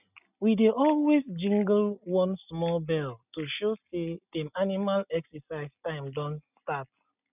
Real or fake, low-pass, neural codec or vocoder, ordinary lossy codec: real; 3.6 kHz; none; none